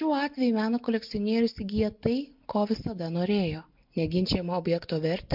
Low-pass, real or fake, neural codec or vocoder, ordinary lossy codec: 5.4 kHz; real; none; MP3, 48 kbps